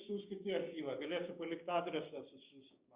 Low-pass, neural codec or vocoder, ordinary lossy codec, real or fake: 3.6 kHz; codec, 16 kHz, 6 kbps, DAC; Opus, 64 kbps; fake